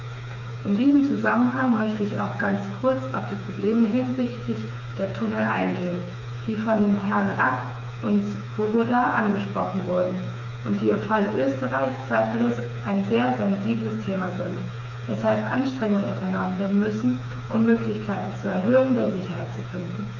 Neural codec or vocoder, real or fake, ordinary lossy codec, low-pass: codec, 16 kHz, 4 kbps, FreqCodec, smaller model; fake; none; 7.2 kHz